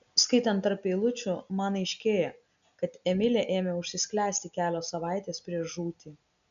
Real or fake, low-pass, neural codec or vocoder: real; 7.2 kHz; none